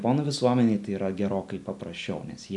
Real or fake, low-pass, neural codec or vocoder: real; 10.8 kHz; none